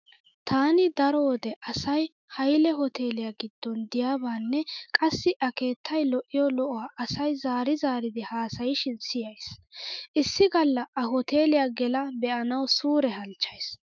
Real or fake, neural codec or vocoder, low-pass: fake; autoencoder, 48 kHz, 128 numbers a frame, DAC-VAE, trained on Japanese speech; 7.2 kHz